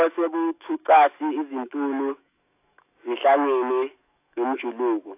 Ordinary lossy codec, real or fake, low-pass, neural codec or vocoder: AAC, 32 kbps; fake; 3.6 kHz; autoencoder, 48 kHz, 128 numbers a frame, DAC-VAE, trained on Japanese speech